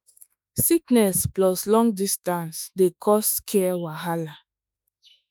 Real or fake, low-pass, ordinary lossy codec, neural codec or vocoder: fake; none; none; autoencoder, 48 kHz, 32 numbers a frame, DAC-VAE, trained on Japanese speech